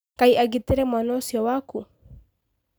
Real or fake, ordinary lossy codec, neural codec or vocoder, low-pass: real; none; none; none